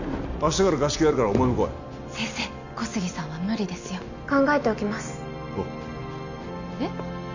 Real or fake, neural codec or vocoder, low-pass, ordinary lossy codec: real; none; 7.2 kHz; none